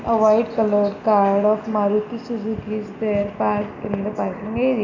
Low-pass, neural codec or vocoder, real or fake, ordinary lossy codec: 7.2 kHz; none; real; none